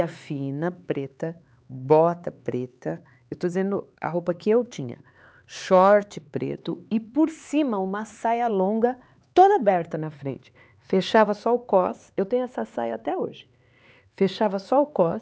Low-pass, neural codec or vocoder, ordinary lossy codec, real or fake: none; codec, 16 kHz, 4 kbps, X-Codec, HuBERT features, trained on LibriSpeech; none; fake